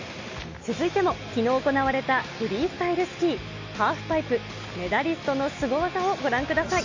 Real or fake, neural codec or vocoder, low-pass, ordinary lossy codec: real; none; 7.2 kHz; MP3, 48 kbps